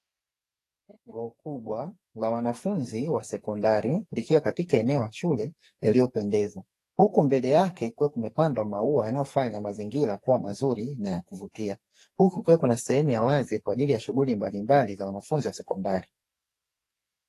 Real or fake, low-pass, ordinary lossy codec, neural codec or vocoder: fake; 14.4 kHz; AAC, 48 kbps; codec, 44.1 kHz, 2.6 kbps, SNAC